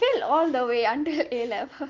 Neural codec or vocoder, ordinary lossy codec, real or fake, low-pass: none; Opus, 32 kbps; real; 7.2 kHz